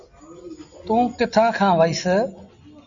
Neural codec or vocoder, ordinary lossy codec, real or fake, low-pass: none; MP3, 96 kbps; real; 7.2 kHz